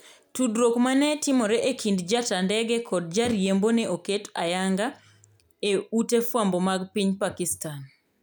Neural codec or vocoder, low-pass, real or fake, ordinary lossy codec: none; none; real; none